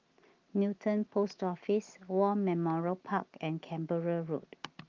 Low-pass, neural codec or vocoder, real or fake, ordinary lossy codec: 7.2 kHz; none; real; Opus, 32 kbps